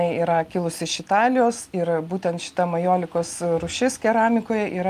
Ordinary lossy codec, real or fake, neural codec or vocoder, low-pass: Opus, 32 kbps; real; none; 14.4 kHz